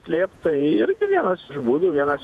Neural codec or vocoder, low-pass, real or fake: vocoder, 48 kHz, 128 mel bands, Vocos; 14.4 kHz; fake